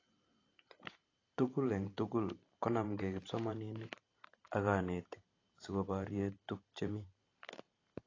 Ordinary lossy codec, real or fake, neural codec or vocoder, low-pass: AAC, 32 kbps; fake; vocoder, 44.1 kHz, 128 mel bands every 512 samples, BigVGAN v2; 7.2 kHz